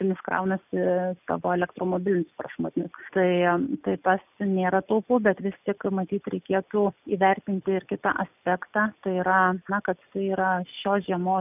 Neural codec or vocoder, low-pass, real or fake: none; 3.6 kHz; real